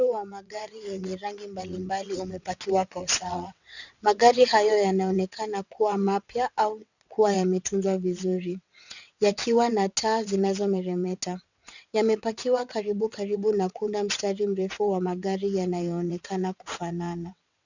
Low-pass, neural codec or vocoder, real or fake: 7.2 kHz; vocoder, 22.05 kHz, 80 mel bands, WaveNeXt; fake